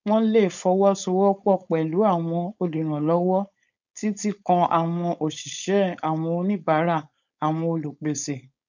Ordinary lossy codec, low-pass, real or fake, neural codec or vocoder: none; 7.2 kHz; fake; codec, 16 kHz, 4.8 kbps, FACodec